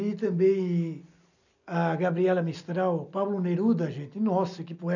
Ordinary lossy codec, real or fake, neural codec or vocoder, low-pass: none; real; none; 7.2 kHz